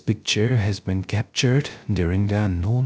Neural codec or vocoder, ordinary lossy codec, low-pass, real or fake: codec, 16 kHz, 0.3 kbps, FocalCodec; none; none; fake